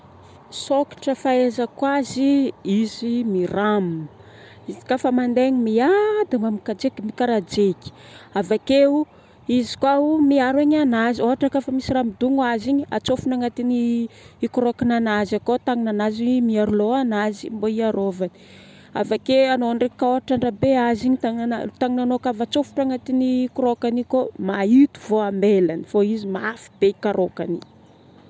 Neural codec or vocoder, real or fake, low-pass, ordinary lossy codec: none; real; none; none